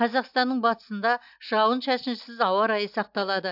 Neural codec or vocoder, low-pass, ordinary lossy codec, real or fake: none; 5.4 kHz; AAC, 48 kbps; real